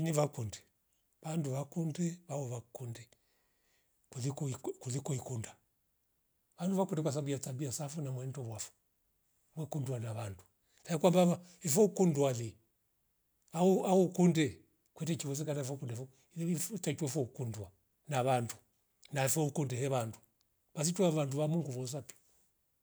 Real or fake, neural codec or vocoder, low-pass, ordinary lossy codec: real; none; none; none